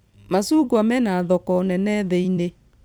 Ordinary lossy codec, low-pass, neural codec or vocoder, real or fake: none; none; vocoder, 44.1 kHz, 128 mel bands every 256 samples, BigVGAN v2; fake